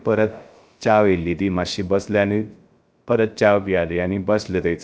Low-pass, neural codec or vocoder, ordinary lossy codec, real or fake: none; codec, 16 kHz, 0.3 kbps, FocalCodec; none; fake